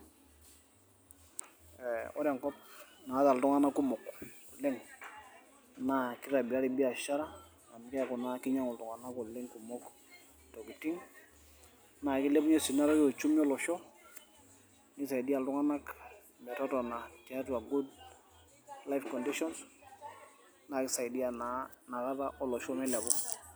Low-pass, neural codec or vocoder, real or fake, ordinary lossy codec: none; none; real; none